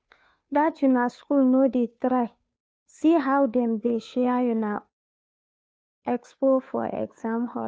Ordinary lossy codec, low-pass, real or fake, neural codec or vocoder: none; none; fake; codec, 16 kHz, 2 kbps, FunCodec, trained on Chinese and English, 25 frames a second